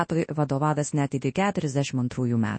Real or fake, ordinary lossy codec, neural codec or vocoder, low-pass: fake; MP3, 32 kbps; codec, 24 kHz, 0.9 kbps, WavTokenizer, large speech release; 9.9 kHz